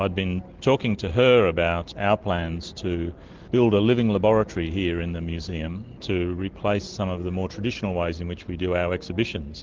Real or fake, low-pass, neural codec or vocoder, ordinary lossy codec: real; 7.2 kHz; none; Opus, 16 kbps